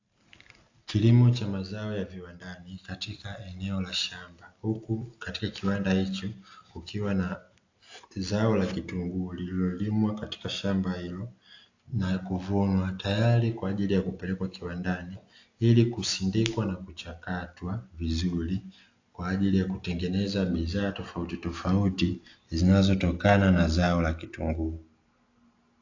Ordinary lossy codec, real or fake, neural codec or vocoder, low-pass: AAC, 48 kbps; real; none; 7.2 kHz